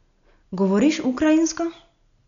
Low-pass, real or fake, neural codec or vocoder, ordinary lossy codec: 7.2 kHz; real; none; none